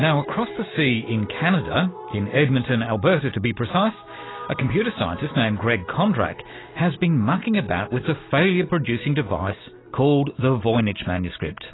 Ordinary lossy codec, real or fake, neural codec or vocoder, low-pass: AAC, 16 kbps; real; none; 7.2 kHz